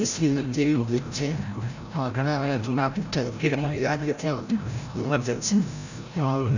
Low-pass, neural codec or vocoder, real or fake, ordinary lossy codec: 7.2 kHz; codec, 16 kHz, 0.5 kbps, FreqCodec, larger model; fake; none